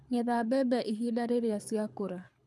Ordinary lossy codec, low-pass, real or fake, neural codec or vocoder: none; none; fake; codec, 24 kHz, 6 kbps, HILCodec